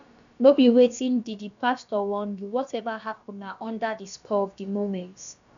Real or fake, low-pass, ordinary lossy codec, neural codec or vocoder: fake; 7.2 kHz; none; codec, 16 kHz, about 1 kbps, DyCAST, with the encoder's durations